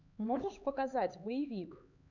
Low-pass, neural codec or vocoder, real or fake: 7.2 kHz; codec, 16 kHz, 4 kbps, X-Codec, HuBERT features, trained on LibriSpeech; fake